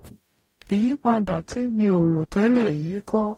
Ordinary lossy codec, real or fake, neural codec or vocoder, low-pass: AAC, 48 kbps; fake; codec, 44.1 kHz, 0.9 kbps, DAC; 19.8 kHz